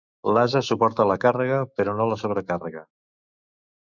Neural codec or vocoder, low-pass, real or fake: autoencoder, 48 kHz, 128 numbers a frame, DAC-VAE, trained on Japanese speech; 7.2 kHz; fake